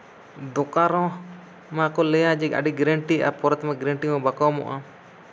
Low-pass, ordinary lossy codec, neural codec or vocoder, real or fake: none; none; none; real